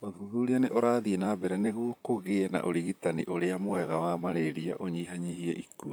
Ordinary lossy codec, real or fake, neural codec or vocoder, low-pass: none; fake; vocoder, 44.1 kHz, 128 mel bands, Pupu-Vocoder; none